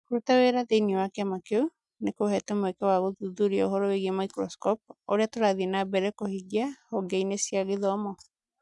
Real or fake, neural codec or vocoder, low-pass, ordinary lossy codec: real; none; 10.8 kHz; none